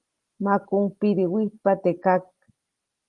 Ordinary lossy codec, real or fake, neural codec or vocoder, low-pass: Opus, 24 kbps; real; none; 10.8 kHz